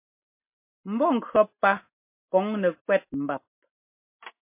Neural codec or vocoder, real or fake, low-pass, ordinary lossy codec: none; real; 3.6 kHz; MP3, 24 kbps